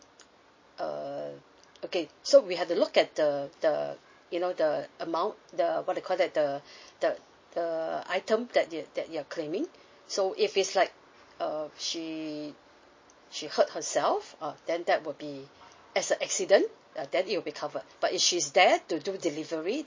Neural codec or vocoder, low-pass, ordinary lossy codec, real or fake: none; 7.2 kHz; MP3, 32 kbps; real